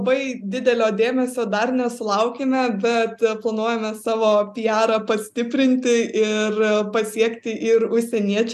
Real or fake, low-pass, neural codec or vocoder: real; 14.4 kHz; none